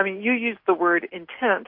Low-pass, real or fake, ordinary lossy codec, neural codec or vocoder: 5.4 kHz; real; MP3, 32 kbps; none